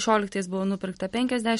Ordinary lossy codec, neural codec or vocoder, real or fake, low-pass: MP3, 48 kbps; none; real; 19.8 kHz